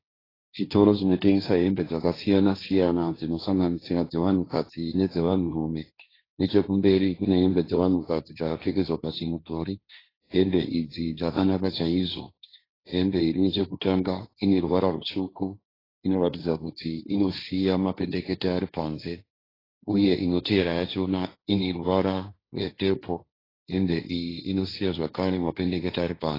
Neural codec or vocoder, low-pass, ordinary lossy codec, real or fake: codec, 16 kHz, 1.1 kbps, Voila-Tokenizer; 5.4 kHz; AAC, 24 kbps; fake